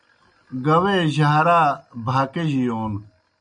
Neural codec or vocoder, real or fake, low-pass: none; real; 9.9 kHz